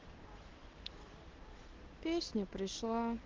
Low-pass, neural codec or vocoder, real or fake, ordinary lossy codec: 7.2 kHz; none; real; Opus, 16 kbps